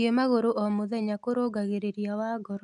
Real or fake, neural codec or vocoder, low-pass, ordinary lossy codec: real; none; 10.8 kHz; none